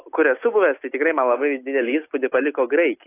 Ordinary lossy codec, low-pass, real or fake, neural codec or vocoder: AAC, 24 kbps; 3.6 kHz; real; none